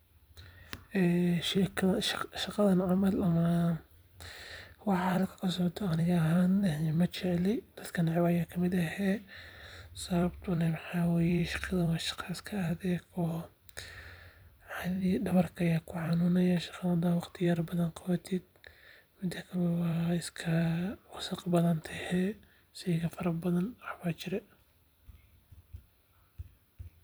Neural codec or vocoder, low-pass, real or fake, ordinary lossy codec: vocoder, 44.1 kHz, 128 mel bands every 256 samples, BigVGAN v2; none; fake; none